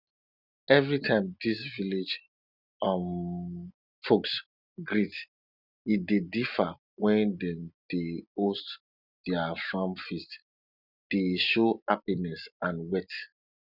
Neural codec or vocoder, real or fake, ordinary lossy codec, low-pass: none; real; none; 5.4 kHz